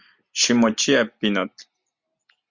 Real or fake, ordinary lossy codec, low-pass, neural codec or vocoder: real; Opus, 64 kbps; 7.2 kHz; none